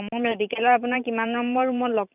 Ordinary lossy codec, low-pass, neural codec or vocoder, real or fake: none; 3.6 kHz; vocoder, 44.1 kHz, 128 mel bands every 512 samples, BigVGAN v2; fake